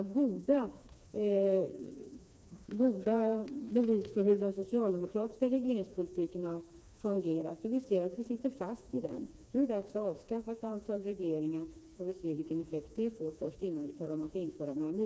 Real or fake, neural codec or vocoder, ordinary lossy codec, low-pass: fake; codec, 16 kHz, 2 kbps, FreqCodec, smaller model; none; none